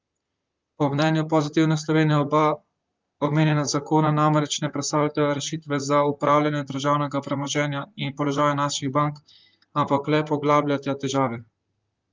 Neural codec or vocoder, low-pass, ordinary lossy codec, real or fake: vocoder, 44.1 kHz, 128 mel bands, Pupu-Vocoder; 7.2 kHz; Opus, 24 kbps; fake